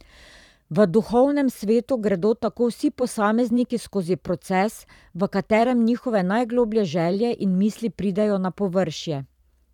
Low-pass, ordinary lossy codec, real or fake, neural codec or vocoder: 19.8 kHz; none; fake; vocoder, 44.1 kHz, 128 mel bands every 512 samples, BigVGAN v2